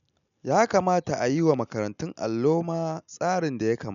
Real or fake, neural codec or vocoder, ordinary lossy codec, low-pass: real; none; none; 7.2 kHz